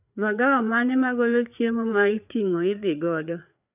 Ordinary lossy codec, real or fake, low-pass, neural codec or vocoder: none; fake; 3.6 kHz; codec, 16 kHz, 4 kbps, FreqCodec, larger model